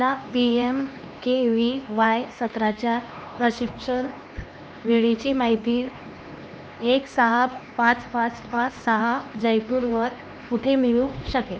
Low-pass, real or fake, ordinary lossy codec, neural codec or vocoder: none; fake; none; codec, 16 kHz, 2 kbps, X-Codec, HuBERT features, trained on LibriSpeech